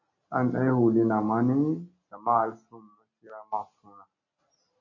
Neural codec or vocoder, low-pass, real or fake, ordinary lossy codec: none; 7.2 kHz; real; MP3, 32 kbps